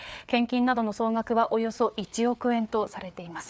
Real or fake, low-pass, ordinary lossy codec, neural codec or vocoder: fake; none; none; codec, 16 kHz, 4 kbps, FreqCodec, larger model